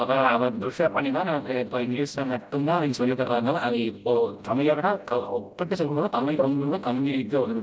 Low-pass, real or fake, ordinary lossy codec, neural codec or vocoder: none; fake; none; codec, 16 kHz, 0.5 kbps, FreqCodec, smaller model